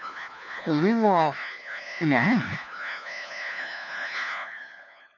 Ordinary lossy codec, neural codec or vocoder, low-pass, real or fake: none; codec, 16 kHz, 1 kbps, FunCodec, trained on LibriTTS, 50 frames a second; 7.2 kHz; fake